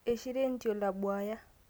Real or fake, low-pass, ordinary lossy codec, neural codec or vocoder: real; none; none; none